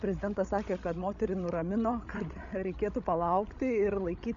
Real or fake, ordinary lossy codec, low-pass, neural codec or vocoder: fake; MP3, 96 kbps; 7.2 kHz; codec, 16 kHz, 16 kbps, FreqCodec, larger model